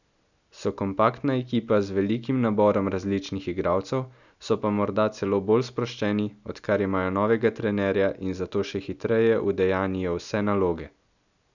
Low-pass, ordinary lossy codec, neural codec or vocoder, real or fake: 7.2 kHz; none; none; real